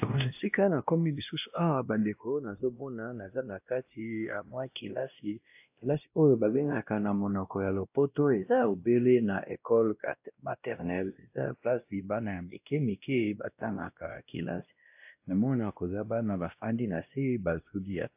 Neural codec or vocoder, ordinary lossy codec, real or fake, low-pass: codec, 16 kHz, 1 kbps, X-Codec, WavLM features, trained on Multilingual LibriSpeech; AAC, 32 kbps; fake; 3.6 kHz